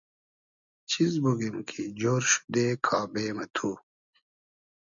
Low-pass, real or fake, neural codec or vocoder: 7.2 kHz; real; none